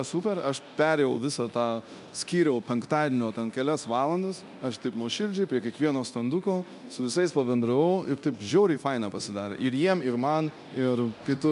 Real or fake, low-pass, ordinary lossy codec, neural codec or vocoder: fake; 10.8 kHz; MP3, 96 kbps; codec, 24 kHz, 0.9 kbps, DualCodec